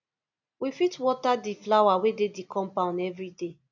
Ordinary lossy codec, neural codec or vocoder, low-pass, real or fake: none; none; 7.2 kHz; real